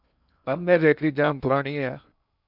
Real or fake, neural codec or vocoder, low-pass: fake; codec, 16 kHz in and 24 kHz out, 0.6 kbps, FocalCodec, streaming, 2048 codes; 5.4 kHz